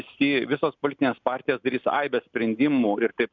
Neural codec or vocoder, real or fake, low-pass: none; real; 7.2 kHz